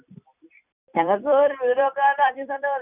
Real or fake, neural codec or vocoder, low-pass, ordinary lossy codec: fake; vocoder, 44.1 kHz, 128 mel bands every 256 samples, BigVGAN v2; 3.6 kHz; none